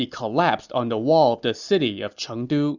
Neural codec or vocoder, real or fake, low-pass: none; real; 7.2 kHz